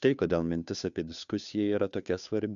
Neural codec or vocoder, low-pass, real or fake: codec, 16 kHz, 2 kbps, FunCodec, trained on Chinese and English, 25 frames a second; 7.2 kHz; fake